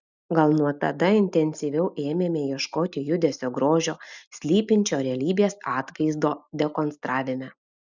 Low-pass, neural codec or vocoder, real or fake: 7.2 kHz; none; real